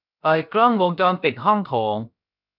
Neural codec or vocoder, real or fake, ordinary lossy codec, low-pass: codec, 16 kHz, about 1 kbps, DyCAST, with the encoder's durations; fake; none; 5.4 kHz